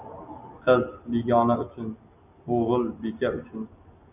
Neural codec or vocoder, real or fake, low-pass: none; real; 3.6 kHz